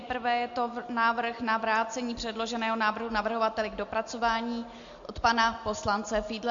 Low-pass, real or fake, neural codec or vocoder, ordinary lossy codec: 7.2 kHz; real; none; MP3, 48 kbps